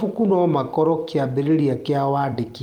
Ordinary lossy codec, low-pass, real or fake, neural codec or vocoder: Opus, 24 kbps; 19.8 kHz; fake; vocoder, 44.1 kHz, 128 mel bands every 256 samples, BigVGAN v2